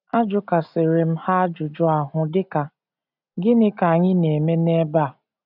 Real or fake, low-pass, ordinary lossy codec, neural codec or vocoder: real; 5.4 kHz; none; none